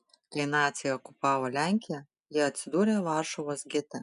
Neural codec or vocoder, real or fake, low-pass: none; real; 10.8 kHz